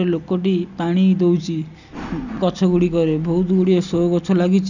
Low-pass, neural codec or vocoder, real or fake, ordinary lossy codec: 7.2 kHz; none; real; none